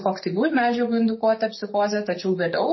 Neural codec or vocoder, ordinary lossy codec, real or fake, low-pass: codec, 44.1 kHz, 7.8 kbps, DAC; MP3, 24 kbps; fake; 7.2 kHz